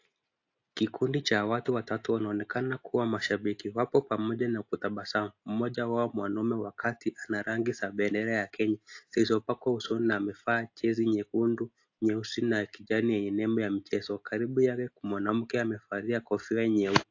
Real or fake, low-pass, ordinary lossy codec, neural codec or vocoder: real; 7.2 kHz; AAC, 48 kbps; none